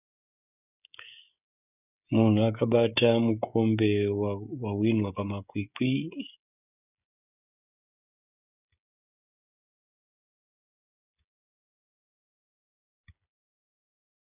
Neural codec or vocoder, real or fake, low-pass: codec, 16 kHz, 16 kbps, FreqCodec, smaller model; fake; 3.6 kHz